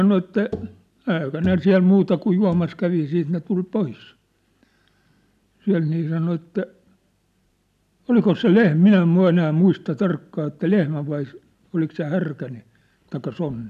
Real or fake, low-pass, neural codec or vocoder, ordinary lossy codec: real; 14.4 kHz; none; none